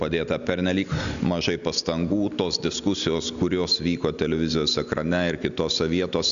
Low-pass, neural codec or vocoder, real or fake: 7.2 kHz; none; real